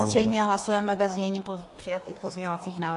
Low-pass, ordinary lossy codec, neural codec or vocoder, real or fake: 10.8 kHz; AAC, 48 kbps; codec, 24 kHz, 1 kbps, SNAC; fake